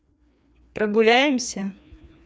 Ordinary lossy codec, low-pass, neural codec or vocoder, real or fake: none; none; codec, 16 kHz, 2 kbps, FreqCodec, larger model; fake